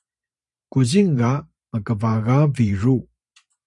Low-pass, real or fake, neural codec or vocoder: 10.8 kHz; fake; vocoder, 44.1 kHz, 128 mel bands every 512 samples, BigVGAN v2